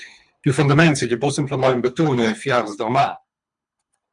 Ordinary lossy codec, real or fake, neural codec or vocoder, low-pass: MP3, 96 kbps; fake; codec, 24 kHz, 3 kbps, HILCodec; 10.8 kHz